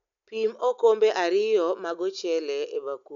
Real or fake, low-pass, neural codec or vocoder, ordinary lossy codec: real; 7.2 kHz; none; none